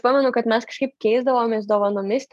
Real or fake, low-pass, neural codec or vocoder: real; 14.4 kHz; none